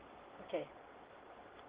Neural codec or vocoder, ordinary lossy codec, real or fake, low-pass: none; Opus, 16 kbps; real; 3.6 kHz